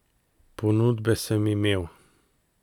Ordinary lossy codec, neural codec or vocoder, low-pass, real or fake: none; none; 19.8 kHz; real